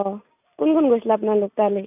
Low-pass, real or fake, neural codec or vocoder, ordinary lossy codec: 3.6 kHz; real; none; none